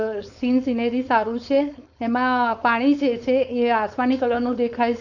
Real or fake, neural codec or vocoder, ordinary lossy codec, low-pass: fake; codec, 16 kHz, 4.8 kbps, FACodec; none; 7.2 kHz